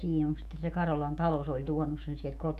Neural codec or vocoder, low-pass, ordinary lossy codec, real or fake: autoencoder, 48 kHz, 128 numbers a frame, DAC-VAE, trained on Japanese speech; 14.4 kHz; none; fake